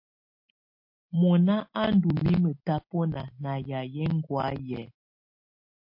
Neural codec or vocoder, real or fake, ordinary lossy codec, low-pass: none; real; MP3, 32 kbps; 5.4 kHz